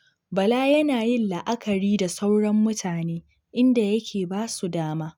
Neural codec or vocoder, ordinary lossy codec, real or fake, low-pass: none; none; real; 19.8 kHz